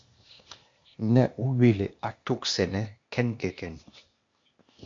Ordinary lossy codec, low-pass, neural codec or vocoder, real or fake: MP3, 48 kbps; 7.2 kHz; codec, 16 kHz, 0.8 kbps, ZipCodec; fake